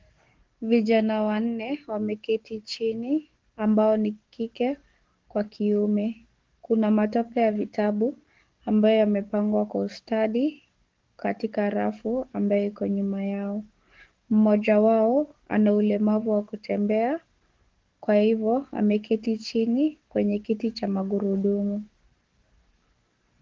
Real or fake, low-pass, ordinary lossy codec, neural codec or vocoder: fake; 7.2 kHz; Opus, 16 kbps; codec, 44.1 kHz, 7.8 kbps, DAC